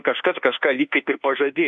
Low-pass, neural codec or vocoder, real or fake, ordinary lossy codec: 10.8 kHz; codec, 24 kHz, 1.2 kbps, DualCodec; fake; MP3, 64 kbps